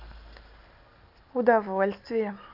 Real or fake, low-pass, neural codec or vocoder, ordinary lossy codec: real; 5.4 kHz; none; none